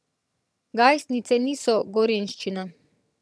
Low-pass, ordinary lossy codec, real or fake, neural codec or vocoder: none; none; fake; vocoder, 22.05 kHz, 80 mel bands, HiFi-GAN